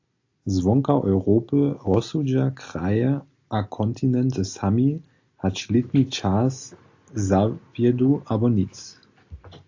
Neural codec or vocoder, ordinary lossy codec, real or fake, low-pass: none; AAC, 48 kbps; real; 7.2 kHz